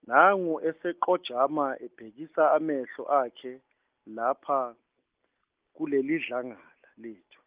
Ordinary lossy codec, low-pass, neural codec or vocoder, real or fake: Opus, 24 kbps; 3.6 kHz; none; real